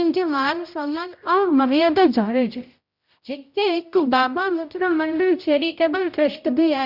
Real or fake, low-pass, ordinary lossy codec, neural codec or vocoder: fake; 5.4 kHz; Opus, 64 kbps; codec, 16 kHz, 0.5 kbps, X-Codec, HuBERT features, trained on general audio